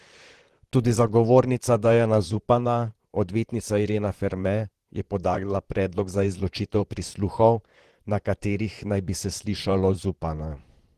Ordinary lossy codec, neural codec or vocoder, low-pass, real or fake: Opus, 16 kbps; vocoder, 44.1 kHz, 128 mel bands, Pupu-Vocoder; 14.4 kHz; fake